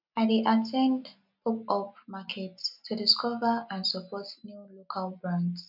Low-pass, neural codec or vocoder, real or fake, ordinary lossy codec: 5.4 kHz; none; real; none